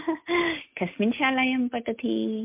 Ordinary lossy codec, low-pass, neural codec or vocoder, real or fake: MP3, 32 kbps; 3.6 kHz; none; real